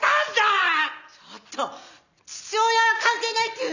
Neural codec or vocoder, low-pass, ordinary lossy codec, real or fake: none; 7.2 kHz; none; real